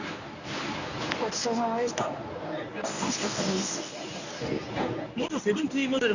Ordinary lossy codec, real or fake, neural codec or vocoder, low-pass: none; fake; codec, 24 kHz, 0.9 kbps, WavTokenizer, medium speech release version 1; 7.2 kHz